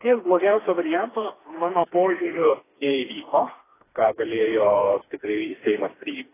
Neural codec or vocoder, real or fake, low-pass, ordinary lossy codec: codec, 16 kHz, 2 kbps, FreqCodec, smaller model; fake; 3.6 kHz; AAC, 16 kbps